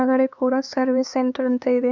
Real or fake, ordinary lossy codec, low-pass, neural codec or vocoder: fake; none; 7.2 kHz; codec, 16 kHz, 4 kbps, X-Codec, HuBERT features, trained on LibriSpeech